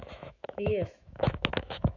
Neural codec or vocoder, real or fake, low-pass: autoencoder, 48 kHz, 128 numbers a frame, DAC-VAE, trained on Japanese speech; fake; 7.2 kHz